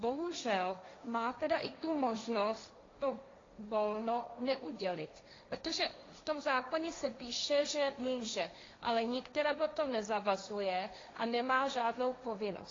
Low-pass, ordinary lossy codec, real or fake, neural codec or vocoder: 7.2 kHz; AAC, 32 kbps; fake; codec, 16 kHz, 1.1 kbps, Voila-Tokenizer